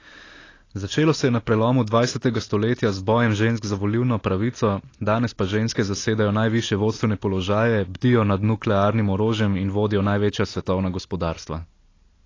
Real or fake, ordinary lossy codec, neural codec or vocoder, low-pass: real; AAC, 32 kbps; none; 7.2 kHz